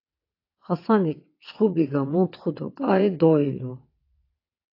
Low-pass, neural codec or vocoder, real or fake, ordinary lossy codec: 5.4 kHz; vocoder, 22.05 kHz, 80 mel bands, WaveNeXt; fake; AAC, 32 kbps